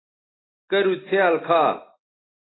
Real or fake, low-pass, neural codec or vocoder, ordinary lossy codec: real; 7.2 kHz; none; AAC, 16 kbps